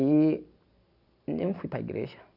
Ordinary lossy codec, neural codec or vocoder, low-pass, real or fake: none; none; 5.4 kHz; real